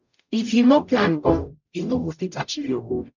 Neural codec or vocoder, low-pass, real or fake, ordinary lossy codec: codec, 44.1 kHz, 0.9 kbps, DAC; 7.2 kHz; fake; none